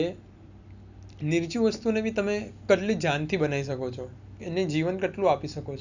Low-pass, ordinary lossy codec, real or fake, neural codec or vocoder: 7.2 kHz; none; real; none